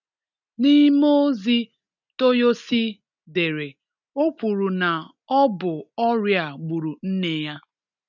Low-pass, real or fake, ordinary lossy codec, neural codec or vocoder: 7.2 kHz; real; none; none